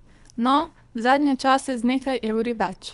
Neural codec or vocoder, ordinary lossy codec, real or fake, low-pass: codec, 24 kHz, 3 kbps, HILCodec; none; fake; 10.8 kHz